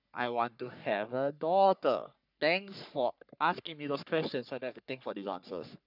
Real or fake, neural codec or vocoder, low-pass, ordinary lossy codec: fake; codec, 44.1 kHz, 3.4 kbps, Pupu-Codec; 5.4 kHz; none